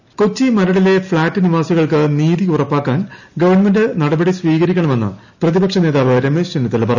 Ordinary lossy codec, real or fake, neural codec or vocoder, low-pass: none; real; none; 7.2 kHz